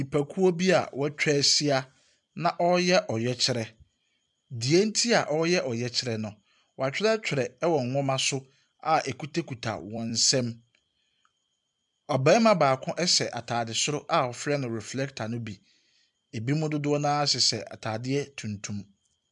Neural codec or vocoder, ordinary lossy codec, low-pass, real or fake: vocoder, 44.1 kHz, 128 mel bands every 256 samples, BigVGAN v2; MP3, 96 kbps; 10.8 kHz; fake